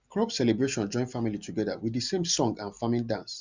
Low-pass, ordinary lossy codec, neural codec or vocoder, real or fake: 7.2 kHz; Opus, 64 kbps; none; real